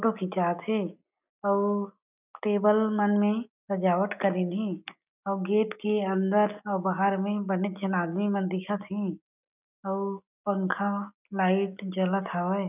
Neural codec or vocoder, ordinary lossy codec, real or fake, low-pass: none; none; real; 3.6 kHz